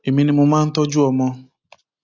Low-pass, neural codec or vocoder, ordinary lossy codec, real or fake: 7.2 kHz; none; AAC, 48 kbps; real